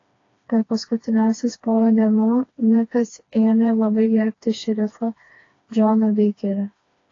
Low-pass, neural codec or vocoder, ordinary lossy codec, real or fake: 7.2 kHz; codec, 16 kHz, 2 kbps, FreqCodec, smaller model; AAC, 32 kbps; fake